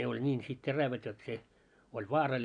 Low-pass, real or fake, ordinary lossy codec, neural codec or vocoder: 9.9 kHz; real; none; none